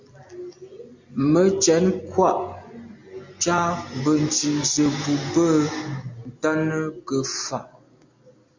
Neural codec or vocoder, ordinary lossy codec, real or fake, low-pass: none; MP3, 64 kbps; real; 7.2 kHz